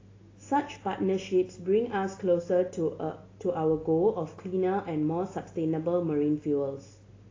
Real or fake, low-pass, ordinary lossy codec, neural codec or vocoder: real; 7.2 kHz; AAC, 32 kbps; none